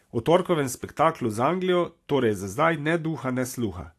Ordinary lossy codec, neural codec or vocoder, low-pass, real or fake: AAC, 64 kbps; none; 14.4 kHz; real